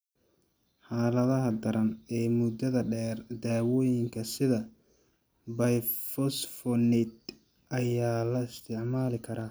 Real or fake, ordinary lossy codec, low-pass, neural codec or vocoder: real; none; none; none